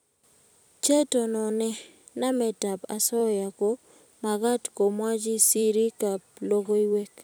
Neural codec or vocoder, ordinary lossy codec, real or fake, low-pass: vocoder, 44.1 kHz, 128 mel bands every 256 samples, BigVGAN v2; none; fake; none